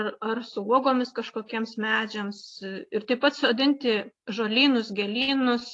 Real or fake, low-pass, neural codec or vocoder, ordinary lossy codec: real; 10.8 kHz; none; AAC, 48 kbps